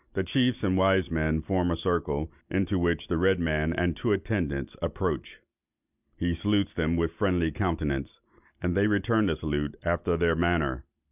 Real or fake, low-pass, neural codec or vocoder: real; 3.6 kHz; none